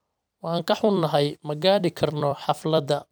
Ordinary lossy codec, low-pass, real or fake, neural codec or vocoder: none; none; fake; vocoder, 44.1 kHz, 128 mel bands every 256 samples, BigVGAN v2